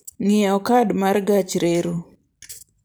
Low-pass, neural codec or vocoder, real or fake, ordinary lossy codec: none; vocoder, 44.1 kHz, 128 mel bands every 256 samples, BigVGAN v2; fake; none